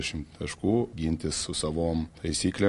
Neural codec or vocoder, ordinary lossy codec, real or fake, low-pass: none; MP3, 48 kbps; real; 14.4 kHz